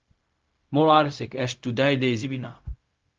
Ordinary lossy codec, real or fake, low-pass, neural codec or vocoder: Opus, 24 kbps; fake; 7.2 kHz; codec, 16 kHz, 0.4 kbps, LongCat-Audio-Codec